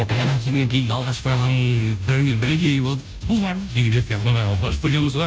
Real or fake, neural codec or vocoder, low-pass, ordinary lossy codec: fake; codec, 16 kHz, 0.5 kbps, FunCodec, trained on Chinese and English, 25 frames a second; none; none